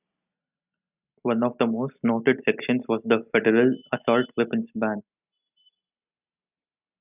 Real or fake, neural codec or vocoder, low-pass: real; none; 3.6 kHz